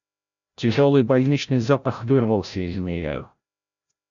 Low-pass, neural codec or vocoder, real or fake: 7.2 kHz; codec, 16 kHz, 0.5 kbps, FreqCodec, larger model; fake